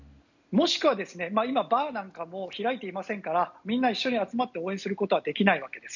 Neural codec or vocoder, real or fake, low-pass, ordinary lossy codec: none; real; 7.2 kHz; none